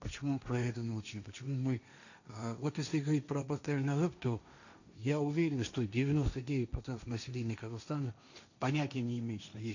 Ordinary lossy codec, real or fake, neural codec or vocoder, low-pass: none; fake; codec, 16 kHz, 1.1 kbps, Voila-Tokenizer; 7.2 kHz